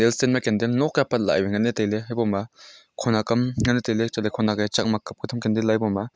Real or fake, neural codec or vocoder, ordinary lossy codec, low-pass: real; none; none; none